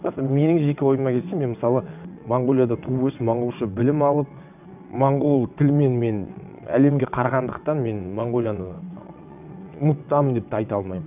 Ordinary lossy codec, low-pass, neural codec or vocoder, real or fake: none; 3.6 kHz; vocoder, 22.05 kHz, 80 mel bands, WaveNeXt; fake